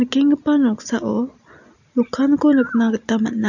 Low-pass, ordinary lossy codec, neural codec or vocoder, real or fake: 7.2 kHz; none; none; real